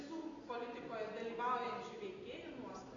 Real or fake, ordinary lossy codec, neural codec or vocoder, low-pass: real; AAC, 32 kbps; none; 7.2 kHz